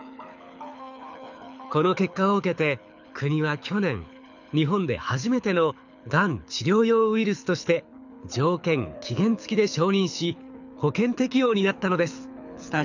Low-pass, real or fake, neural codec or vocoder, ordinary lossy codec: 7.2 kHz; fake; codec, 24 kHz, 6 kbps, HILCodec; none